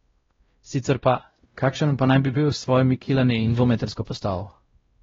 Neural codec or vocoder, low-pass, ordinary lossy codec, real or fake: codec, 16 kHz, 0.5 kbps, X-Codec, WavLM features, trained on Multilingual LibriSpeech; 7.2 kHz; AAC, 24 kbps; fake